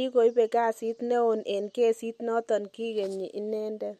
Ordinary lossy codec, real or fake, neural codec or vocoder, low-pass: MP3, 64 kbps; real; none; 14.4 kHz